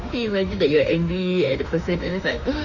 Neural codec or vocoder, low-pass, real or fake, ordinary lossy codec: autoencoder, 48 kHz, 32 numbers a frame, DAC-VAE, trained on Japanese speech; 7.2 kHz; fake; none